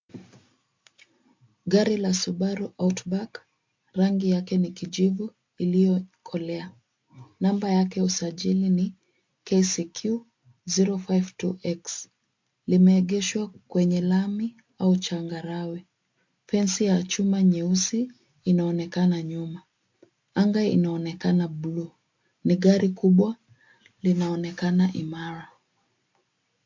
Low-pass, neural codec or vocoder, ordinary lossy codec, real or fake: 7.2 kHz; none; MP3, 48 kbps; real